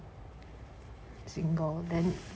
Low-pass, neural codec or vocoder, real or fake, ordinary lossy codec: none; none; real; none